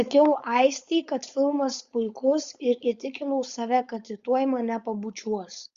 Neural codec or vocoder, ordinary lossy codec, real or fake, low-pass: codec, 16 kHz, 4.8 kbps, FACodec; Opus, 64 kbps; fake; 7.2 kHz